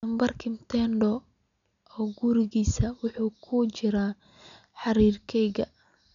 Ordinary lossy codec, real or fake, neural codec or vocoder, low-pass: none; real; none; 7.2 kHz